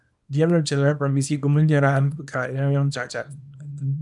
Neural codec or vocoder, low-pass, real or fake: codec, 24 kHz, 0.9 kbps, WavTokenizer, small release; 10.8 kHz; fake